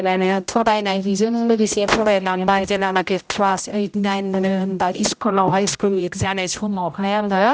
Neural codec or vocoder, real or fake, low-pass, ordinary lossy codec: codec, 16 kHz, 0.5 kbps, X-Codec, HuBERT features, trained on general audio; fake; none; none